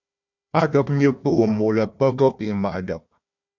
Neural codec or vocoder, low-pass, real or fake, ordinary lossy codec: codec, 16 kHz, 1 kbps, FunCodec, trained on Chinese and English, 50 frames a second; 7.2 kHz; fake; MP3, 64 kbps